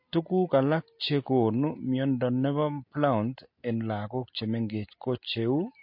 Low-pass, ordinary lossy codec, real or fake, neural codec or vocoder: 5.4 kHz; MP3, 32 kbps; real; none